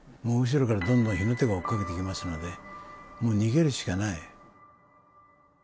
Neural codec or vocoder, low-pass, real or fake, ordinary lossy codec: none; none; real; none